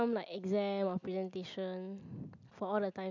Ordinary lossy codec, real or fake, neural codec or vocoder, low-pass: none; real; none; 7.2 kHz